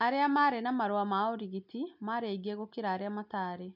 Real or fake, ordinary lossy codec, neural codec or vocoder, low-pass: real; none; none; 5.4 kHz